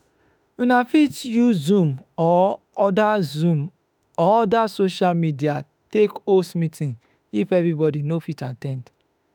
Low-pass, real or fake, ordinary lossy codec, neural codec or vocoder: none; fake; none; autoencoder, 48 kHz, 32 numbers a frame, DAC-VAE, trained on Japanese speech